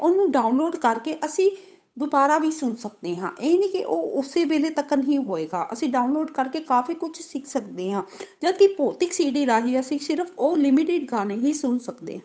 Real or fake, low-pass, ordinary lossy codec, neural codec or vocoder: fake; none; none; codec, 16 kHz, 8 kbps, FunCodec, trained on Chinese and English, 25 frames a second